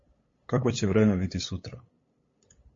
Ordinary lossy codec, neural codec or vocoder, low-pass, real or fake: MP3, 32 kbps; codec, 16 kHz, 8 kbps, FunCodec, trained on LibriTTS, 25 frames a second; 7.2 kHz; fake